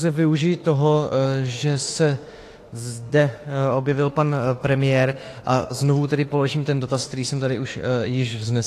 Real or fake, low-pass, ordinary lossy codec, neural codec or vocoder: fake; 14.4 kHz; AAC, 48 kbps; autoencoder, 48 kHz, 32 numbers a frame, DAC-VAE, trained on Japanese speech